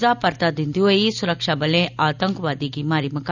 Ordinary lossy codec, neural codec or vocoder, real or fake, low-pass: none; none; real; 7.2 kHz